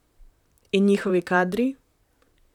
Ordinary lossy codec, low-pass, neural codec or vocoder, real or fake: none; 19.8 kHz; vocoder, 44.1 kHz, 128 mel bands, Pupu-Vocoder; fake